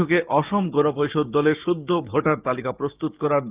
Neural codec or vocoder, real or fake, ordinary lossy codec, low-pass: codec, 16 kHz, 6 kbps, DAC; fake; Opus, 32 kbps; 3.6 kHz